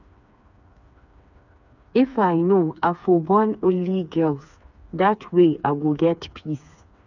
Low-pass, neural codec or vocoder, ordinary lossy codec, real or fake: 7.2 kHz; codec, 16 kHz, 4 kbps, FreqCodec, smaller model; none; fake